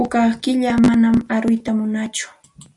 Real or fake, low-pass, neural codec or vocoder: real; 10.8 kHz; none